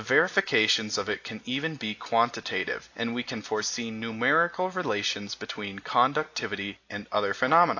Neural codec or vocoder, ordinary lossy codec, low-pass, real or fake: none; AAC, 48 kbps; 7.2 kHz; real